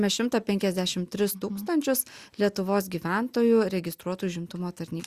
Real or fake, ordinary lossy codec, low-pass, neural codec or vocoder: real; Opus, 64 kbps; 14.4 kHz; none